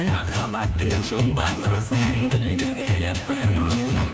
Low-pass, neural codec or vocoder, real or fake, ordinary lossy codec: none; codec, 16 kHz, 1 kbps, FunCodec, trained on LibriTTS, 50 frames a second; fake; none